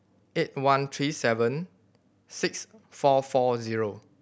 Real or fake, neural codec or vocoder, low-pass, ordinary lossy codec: real; none; none; none